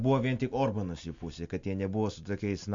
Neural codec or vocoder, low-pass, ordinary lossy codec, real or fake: none; 7.2 kHz; MP3, 48 kbps; real